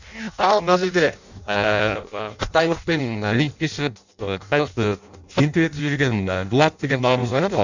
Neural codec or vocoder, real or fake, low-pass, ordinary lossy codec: codec, 16 kHz in and 24 kHz out, 0.6 kbps, FireRedTTS-2 codec; fake; 7.2 kHz; none